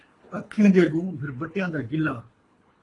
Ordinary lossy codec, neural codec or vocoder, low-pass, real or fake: AAC, 32 kbps; codec, 24 kHz, 3 kbps, HILCodec; 10.8 kHz; fake